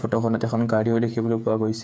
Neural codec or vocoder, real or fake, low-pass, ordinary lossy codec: codec, 16 kHz, 8 kbps, FreqCodec, smaller model; fake; none; none